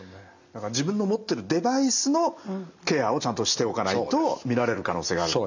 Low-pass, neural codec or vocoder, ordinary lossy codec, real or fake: 7.2 kHz; none; none; real